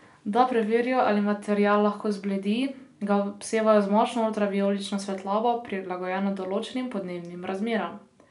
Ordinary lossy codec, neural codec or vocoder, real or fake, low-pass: MP3, 96 kbps; none; real; 10.8 kHz